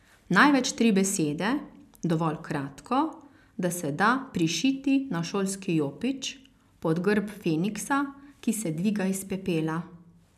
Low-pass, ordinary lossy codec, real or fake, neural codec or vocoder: 14.4 kHz; none; real; none